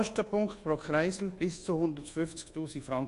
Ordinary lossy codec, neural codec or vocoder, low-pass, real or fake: AAC, 48 kbps; codec, 24 kHz, 1.2 kbps, DualCodec; 10.8 kHz; fake